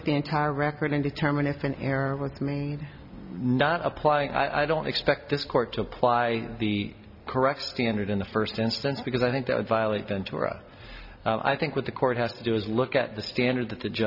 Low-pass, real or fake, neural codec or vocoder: 5.4 kHz; real; none